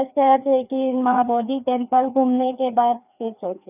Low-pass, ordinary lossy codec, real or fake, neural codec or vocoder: 3.6 kHz; none; fake; codec, 16 kHz, 2 kbps, FreqCodec, larger model